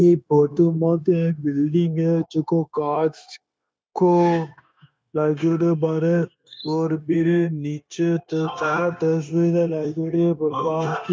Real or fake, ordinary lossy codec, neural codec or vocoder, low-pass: fake; none; codec, 16 kHz, 0.9 kbps, LongCat-Audio-Codec; none